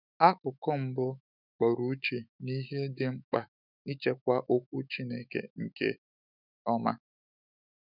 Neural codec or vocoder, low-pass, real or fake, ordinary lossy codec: autoencoder, 48 kHz, 128 numbers a frame, DAC-VAE, trained on Japanese speech; 5.4 kHz; fake; none